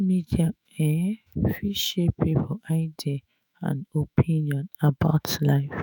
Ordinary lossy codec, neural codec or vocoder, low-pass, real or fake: none; autoencoder, 48 kHz, 128 numbers a frame, DAC-VAE, trained on Japanese speech; none; fake